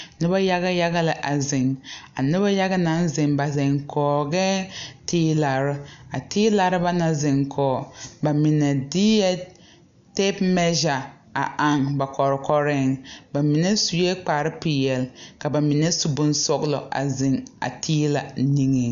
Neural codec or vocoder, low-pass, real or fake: none; 7.2 kHz; real